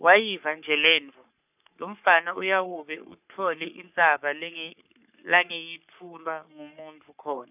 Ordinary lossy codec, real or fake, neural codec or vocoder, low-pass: none; fake; codec, 44.1 kHz, 3.4 kbps, Pupu-Codec; 3.6 kHz